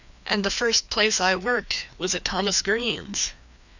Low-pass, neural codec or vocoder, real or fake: 7.2 kHz; codec, 16 kHz, 2 kbps, FreqCodec, larger model; fake